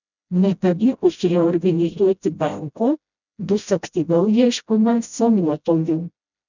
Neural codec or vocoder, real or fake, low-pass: codec, 16 kHz, 0.5 kbps, FreqCodec, smaller model; fake; 7.2 kHz